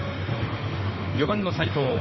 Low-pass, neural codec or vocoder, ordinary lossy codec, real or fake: 7.2 kHz; autoencoder, 48 kHz, 32 numbers a frame, DAC-VAE, trained on Japanese speech; MP3, 24 kbps; fake